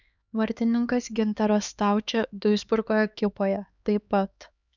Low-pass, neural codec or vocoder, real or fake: 7.2 kHz; codec, 16 kHz, 2 kbps, X-Codec, HuBERT features, trained on LibriSpeech; fake